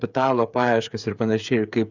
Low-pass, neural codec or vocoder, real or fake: 7.2 kHz; codec, 16 kHz, 8 kbps, FreqCodec, smaller model; fake